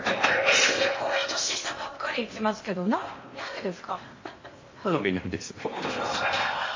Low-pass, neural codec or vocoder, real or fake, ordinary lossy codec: 7.2 kHz; codec, 16 kHz in and 24 kHz out, 0.8 kbps, FocalCodec, streaming, 65536 codes; fake; MP3, 32 kbps